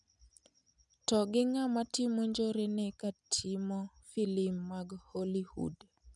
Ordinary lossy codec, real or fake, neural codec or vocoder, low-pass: none; real; none; 10.8 kHz